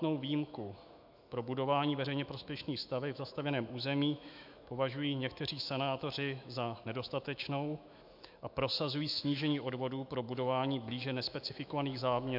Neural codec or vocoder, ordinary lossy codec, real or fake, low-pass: autoencoder, 48 kHz, 128 numbers a frame, DAC-VAE, trained on Japanese speech; MP3, 48 kbps; fake; 5.4 kHz